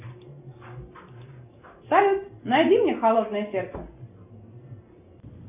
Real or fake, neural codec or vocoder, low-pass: real; none; 3.6 kHz